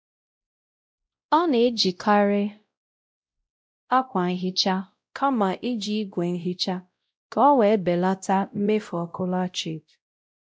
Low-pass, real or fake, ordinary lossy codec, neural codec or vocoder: none; fake; none; codec, 16 kHz, 0.5 kbps, X-Codec, WavLM features, trained on Multilingual LibriSpeech